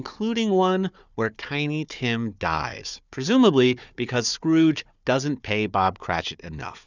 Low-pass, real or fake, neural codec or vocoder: 7.2 kHz; fake; codec, 16 kHz, 4 kbps, FunCodec, trained on Chinese and English, 50 frames a second